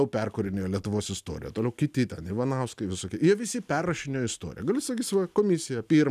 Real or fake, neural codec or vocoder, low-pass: real; none; 14.4 kHz